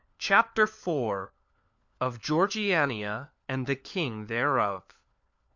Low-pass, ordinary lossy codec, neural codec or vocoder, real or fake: 7.2 kHz; MP3, 64 kbps; codec, 16 kHz, 4 kbps, FunCodec, trained on LibriTTS, 50 frames a second; fake